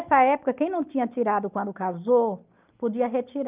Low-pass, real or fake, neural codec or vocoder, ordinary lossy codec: 3.6 kHz; real; none; Opus, 24 kbps